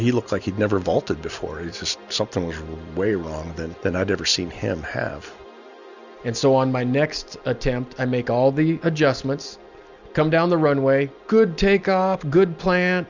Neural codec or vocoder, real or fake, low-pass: none; real; 7.2 kHz